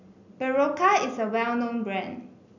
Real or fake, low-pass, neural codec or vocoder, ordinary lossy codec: real; 7.2 kHz; none; none